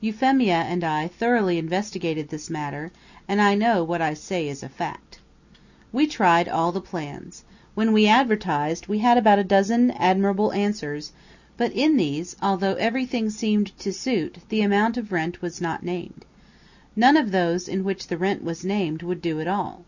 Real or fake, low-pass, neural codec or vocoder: real; 7.2 kHz; none